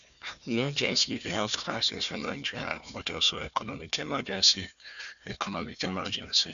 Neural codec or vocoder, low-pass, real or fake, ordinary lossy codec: codec, 16 kHz, 1 kbps, FunCodec, trained on Chinese and English, 50 frames a second; 7.2 kHz; fake; none